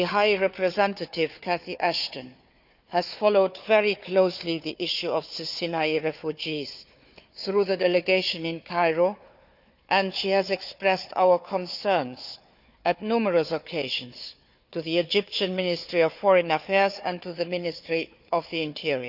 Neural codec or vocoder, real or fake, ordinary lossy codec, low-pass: codec, 16 kHz, 4 kbps, FunCodec, trained on Chinese and English, 50 frames a second; fake; none; 5.4 kHz